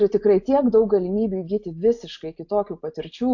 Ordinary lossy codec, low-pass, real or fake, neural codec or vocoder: AAC, 48 kbps; 7.2 kHz; real; none